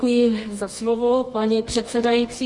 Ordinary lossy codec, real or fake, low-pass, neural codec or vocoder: MP3, 48 kbps; fake; 10.8 kHz; codec, 24 kHz, 0.9 kbps, WavTokenizer, medium music audio release